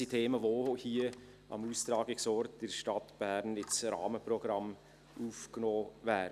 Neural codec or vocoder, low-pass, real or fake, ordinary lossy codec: none; 14.4 kHz; real; none